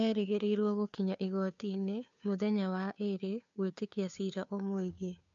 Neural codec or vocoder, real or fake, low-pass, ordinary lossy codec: codec, 16 kHz, 2 kbps, FunCodec, trained on Chinese and English, 25 frames a second; fake; 7.2 kHz; MP3, 64 kbps